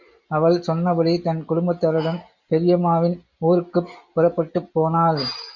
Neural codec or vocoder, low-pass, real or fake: none; 7.2 kHz; real